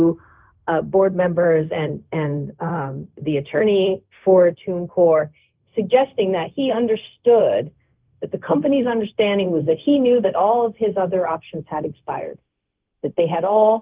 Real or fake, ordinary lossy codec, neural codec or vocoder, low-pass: fake; Opus, 32 kbps; codec, 16 kHz, 0.4 kbps, LongCat-Audio-Codec; 3.6 kHz